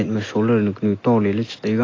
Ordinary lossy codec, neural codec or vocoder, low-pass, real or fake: AAC, 32 kbps; none; 7.2 kHz; real